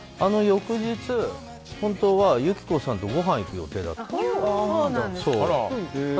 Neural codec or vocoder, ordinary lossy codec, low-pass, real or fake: none; none; none; real